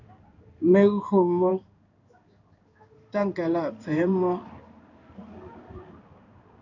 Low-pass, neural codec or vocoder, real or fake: 7.2 kHz; codec, 16 kHz in and 24 kHz out, 1 kbps, XY-Tokenizer; fake